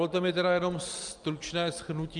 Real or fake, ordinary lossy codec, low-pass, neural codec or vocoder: real; Opus, 32 kbps; 10.8 kHz; none